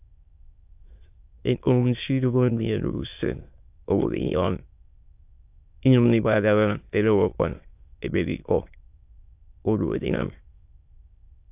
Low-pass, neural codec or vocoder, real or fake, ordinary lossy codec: 3.6 kHz; autoencoder, 22.05 kHz, a latent of 192 numbers a frame, VITS, trained on many speakers; fake; AAC, 32 kbps